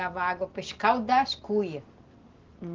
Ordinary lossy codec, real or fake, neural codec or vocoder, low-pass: Opus, 16 kbps; real; none; 7.2 kHz